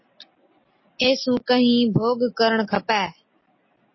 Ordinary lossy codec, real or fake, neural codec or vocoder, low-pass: MP3, 24 kbps; real; none; 7.2 kHz